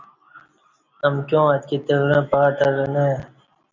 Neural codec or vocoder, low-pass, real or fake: none; 7.2 kHz; real